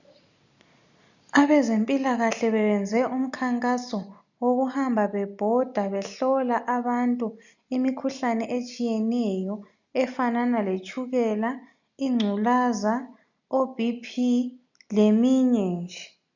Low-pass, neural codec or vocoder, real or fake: 7.2 kHz; none; real